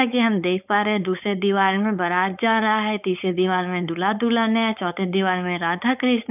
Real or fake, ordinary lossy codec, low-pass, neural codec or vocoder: fake; none; 3.6 kHz; codec, 16 kHz, 4.8 kbps, FACodec